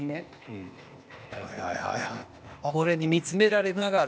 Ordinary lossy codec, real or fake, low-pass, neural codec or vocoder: none; fake; none; codec, 16 kHz, 0.8 kbps, ZipCodec